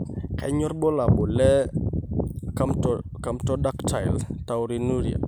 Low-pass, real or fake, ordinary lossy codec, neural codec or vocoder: 19.8 kHz; real; none; none